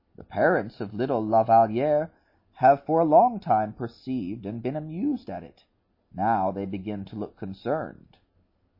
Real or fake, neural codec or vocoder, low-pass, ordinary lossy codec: real; none; 5.4 kHz; MP3, 24 kbps